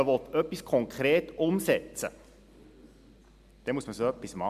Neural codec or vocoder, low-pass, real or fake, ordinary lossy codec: none; 14.4 kHz; real; MP3, 96 kbps